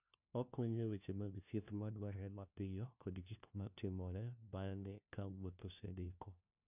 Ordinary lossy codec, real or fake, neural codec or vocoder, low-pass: none; fake; codec, 16 kHz, 1 kbps, FunCodec, trained on LibriTTS, 50 frames a second; 3.6 kHz